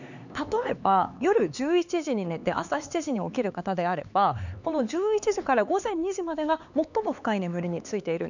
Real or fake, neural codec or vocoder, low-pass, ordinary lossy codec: fake; codec, 16 kHz, 4 kbps, X-Codec, HuBERT features, trained on LibriSpeech; 7.2 kHz; none